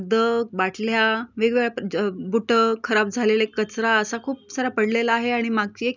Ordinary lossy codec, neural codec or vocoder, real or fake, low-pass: none; none; real; 7.2 kHz